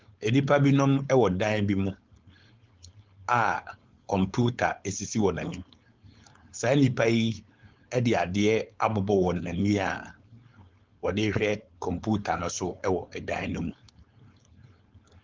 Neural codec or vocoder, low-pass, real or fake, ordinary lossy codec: codec, 16 kHz, 4.8 kbps, FACodec; 7.2 kHz; fake; Opus, 32 kbps